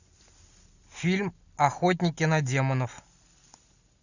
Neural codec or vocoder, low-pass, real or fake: none; 7.2 kHz; real